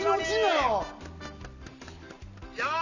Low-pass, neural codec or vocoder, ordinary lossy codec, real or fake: 7.2 kHz; none; none; real